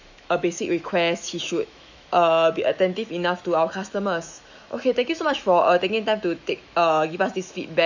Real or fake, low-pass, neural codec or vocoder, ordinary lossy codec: fake; 7.2 kHz; autoencoder, 48 kHz, 128 numbers a frame, DAC-VAE, trained on Japanese speech; none